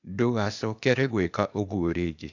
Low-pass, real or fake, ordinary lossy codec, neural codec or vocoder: 7.2 kHz; fake; none; codec, 16 kHz, 0.8 kbps, ZipCodec